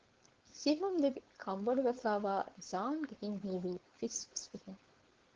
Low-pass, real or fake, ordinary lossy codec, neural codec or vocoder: 7.2 kHz; fake; Opus, 16 kbps; codec, 16 kHz, 4.8 kbps, FACodec